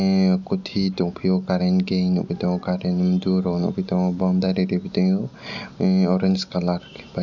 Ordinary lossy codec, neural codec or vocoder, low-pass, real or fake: none; none; 7.2 kHz; real